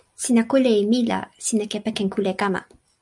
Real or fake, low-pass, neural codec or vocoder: real; 10.8 kHz; none